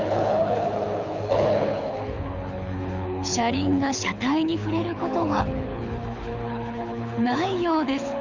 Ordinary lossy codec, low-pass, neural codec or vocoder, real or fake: none; 7.2 kHz; codec, 24 kHz, 6 kbps, HILCodec; fake